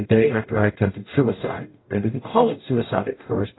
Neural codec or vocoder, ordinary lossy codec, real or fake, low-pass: codec, 44.1 kHz, 0.9 kbps, DAC; AAC, 16 kbps; fake; 7.2 kHz